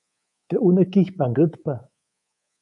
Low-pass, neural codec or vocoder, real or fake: 10.8 kHz; codec, 24 kHz, 3.1 kbps, DualCodec; fake